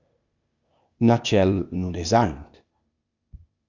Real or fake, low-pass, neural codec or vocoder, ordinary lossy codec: fake; 7.2 kHz; codec, 16 kHz, 0.8 kbps, ZipCodec; Opus, 64 kbps